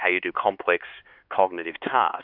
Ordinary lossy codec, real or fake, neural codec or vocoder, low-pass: AAC, 48 kbps; fake; codec, 16 kHz, 4 kbps, X-Codec, HuBERT features, trained on LibriSpeech; 5.4 kHz